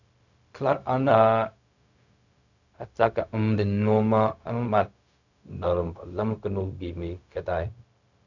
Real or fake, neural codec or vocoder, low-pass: fake; codec, 16 kHz, 0.4 kbps, LongCat-Audio-Codec; 7.2 kHz